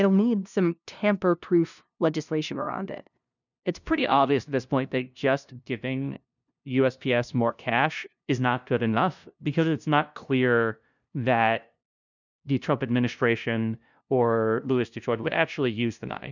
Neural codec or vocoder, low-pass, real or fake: codec, 16 kHz, 0.5 kbps, FunCodec, trained on LibriTTS, 25 frames a second; 7.2 kHz; fake